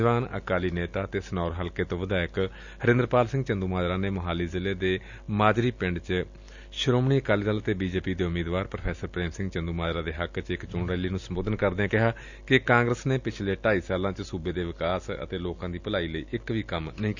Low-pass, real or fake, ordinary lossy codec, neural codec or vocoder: 7.2 kHz; real; none; none